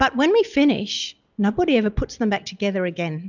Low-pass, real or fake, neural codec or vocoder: 7.2 kHz; real; none